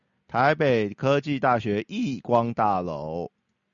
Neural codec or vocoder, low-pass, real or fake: none; 7.2 kHz; real